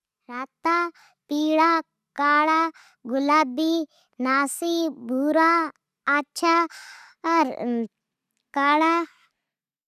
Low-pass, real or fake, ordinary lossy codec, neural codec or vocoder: 14.4 kHz; real; none; none